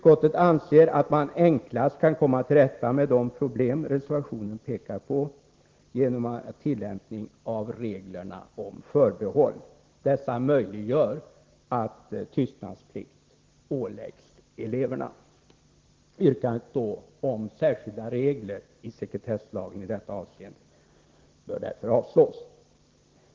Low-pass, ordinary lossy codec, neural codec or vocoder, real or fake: 7.2 kHz; Opus, 16 kbps; none; real